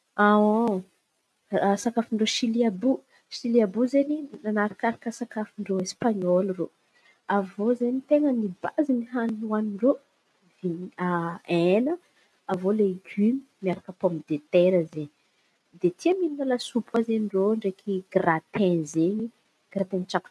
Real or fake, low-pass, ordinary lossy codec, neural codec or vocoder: real; none; none; none